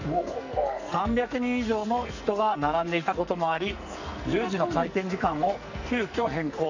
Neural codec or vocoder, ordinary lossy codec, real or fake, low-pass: codec, 44.1 kHz, 2.6 kbps, SNAC; none; fake; 7.2 kHz